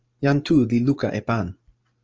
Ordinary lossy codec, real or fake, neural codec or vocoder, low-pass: Opus, 24 kbps; fake; vocoder, 44.1 kHz, 128 mel bands every 512 samples, BigVGAN v2; 7.2 kHz